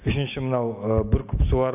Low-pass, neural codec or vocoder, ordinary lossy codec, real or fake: 3.6 kHz; none; AAC, 32 kbps; real